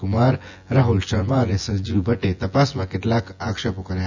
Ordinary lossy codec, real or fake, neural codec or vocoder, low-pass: none; fake; vocoder, 24 kHz, 100 mel bands, Vocos; 7.2 kHz